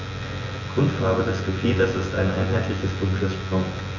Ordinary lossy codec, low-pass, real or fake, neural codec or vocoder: Opus, 64 kbps; 7.2 kHz; fake; vocoder, 24 kHz, 100 mel bands, Vocos